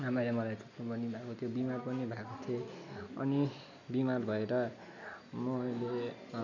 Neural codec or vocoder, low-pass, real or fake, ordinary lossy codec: none; 7.2 kHz; real; none